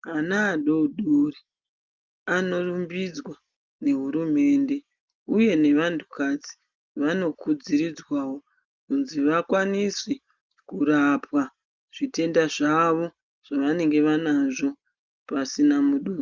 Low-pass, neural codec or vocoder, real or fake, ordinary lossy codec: 7.2 kHz; none; real; Opus, 32 kbps